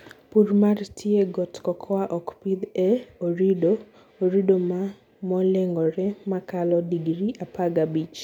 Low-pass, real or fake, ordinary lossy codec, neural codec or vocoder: 19.8 kHz; real; none; none